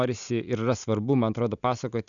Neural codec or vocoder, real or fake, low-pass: none; real; 7.2 kHz